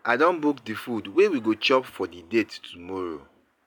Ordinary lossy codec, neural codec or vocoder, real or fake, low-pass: none; none; real; none